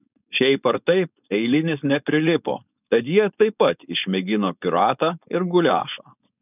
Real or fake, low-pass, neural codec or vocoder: fake; 3.6 kHz; codec, 16 kHz, 4.8 kbps, FACodec